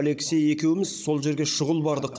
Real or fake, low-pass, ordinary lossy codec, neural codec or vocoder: fake; none; none; codec, 16 kHz, 16 kbps, FunCodec, trained on Chinese and English, 50 frames a second